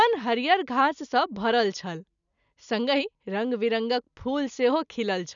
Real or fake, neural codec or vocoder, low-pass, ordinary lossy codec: real; none; 7.2 kHz; none